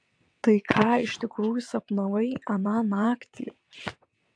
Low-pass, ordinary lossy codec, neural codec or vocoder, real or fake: 9.9 kHz; AAC, 64 kbps; vocoder, 22.05 kHz, 80 mel bands, WaveNeXt; fake